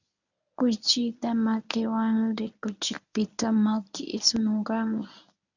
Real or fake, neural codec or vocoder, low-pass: fake; codec, 24 kHz, 0.9 kbps, WavTokenizer, medium speech release version 1; 7.2 kHz